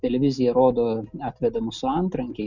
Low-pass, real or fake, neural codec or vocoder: 7.2 kHz; real; none